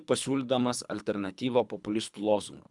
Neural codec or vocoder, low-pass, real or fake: codec, 24 kHz, 3 kbps, HILCodec; 10.8 kHz; fake